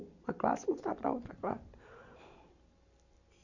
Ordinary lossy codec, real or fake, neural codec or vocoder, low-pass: none; real; none; 7.2 kHz